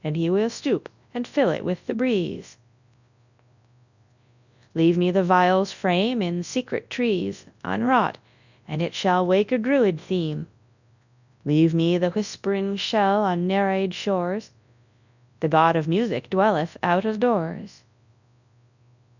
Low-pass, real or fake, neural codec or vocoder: 7.2 kHz; fake; codec, 24 kHz, 0.9 kbps, WavTokenizer, large speech release